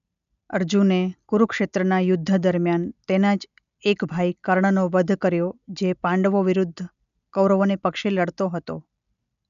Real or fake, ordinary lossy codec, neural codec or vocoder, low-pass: real; none; none; 7.2 kHz